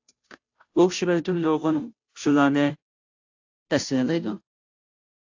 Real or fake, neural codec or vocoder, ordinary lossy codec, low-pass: fake; codec, 16 kHz, 0.5 kbps, FunCodec, trained on Chinese and English, 25 frames a second; AAC, 48 kbps; 7.2 kHz